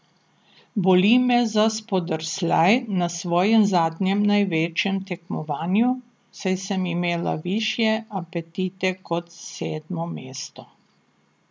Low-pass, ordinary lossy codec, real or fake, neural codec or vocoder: none; none; real; none